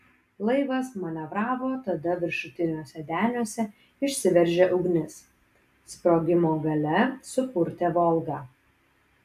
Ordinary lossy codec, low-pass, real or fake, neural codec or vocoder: AAC, 96 kbps; 14.4 kHz; real; none